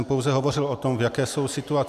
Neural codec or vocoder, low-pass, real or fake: none; 14.4 kHz; real